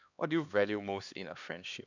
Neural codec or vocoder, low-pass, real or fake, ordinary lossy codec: codec, 16 kHz, 2 kbps, X-Codec, HuBERT features, trained on LibriSpeech; 7.2 kHz; fake; none